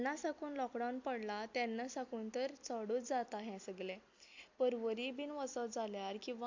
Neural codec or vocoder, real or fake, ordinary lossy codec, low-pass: none; real; AAC, 48 kbps; 7.2 kHz